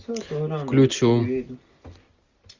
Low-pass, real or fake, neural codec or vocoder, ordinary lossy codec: 7.2 kHz; real; none; Opus, 64 kbps